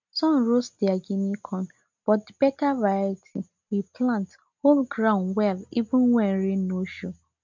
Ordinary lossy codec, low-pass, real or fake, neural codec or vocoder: MP3, 64 kbps; 7.2 kHz; real; none